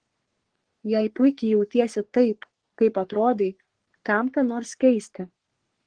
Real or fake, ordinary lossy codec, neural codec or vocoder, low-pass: fake; Opus, 16 kbps; codec, 44.1 kHz, 3.4 kbps, Pupu-Codec; 9.9 kHz